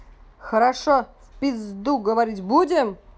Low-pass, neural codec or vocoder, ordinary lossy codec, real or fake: none; none; none; real